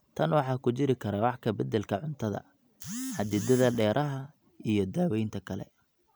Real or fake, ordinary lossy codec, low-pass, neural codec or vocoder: real; none; none; none